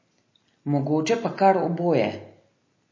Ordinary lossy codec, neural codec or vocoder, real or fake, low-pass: MP3, 32 kbps; none; real; 7.2 kHz